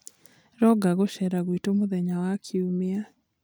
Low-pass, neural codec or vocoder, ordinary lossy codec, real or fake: none; none; none; real